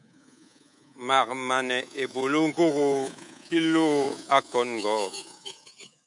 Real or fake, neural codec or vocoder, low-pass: fake; codec, 24 kHz, 3.1 kbps, DualCodec; 10.8 kHz